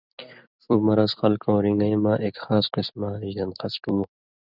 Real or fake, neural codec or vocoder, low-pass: fake; vocoder, 44.1 kHz, 128 mel bands every 256 samples, BigVGAN v2; 5.4 kHz